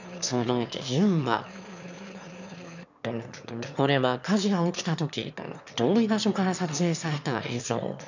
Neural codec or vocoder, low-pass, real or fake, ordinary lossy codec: autoencoder, 22.05 kHz, a latent of 192 numbers a frame, VITS, trained on one speaker; 7.2 kHz; fake; none